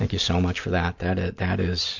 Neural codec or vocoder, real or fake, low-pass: none; real; 7.2 kHz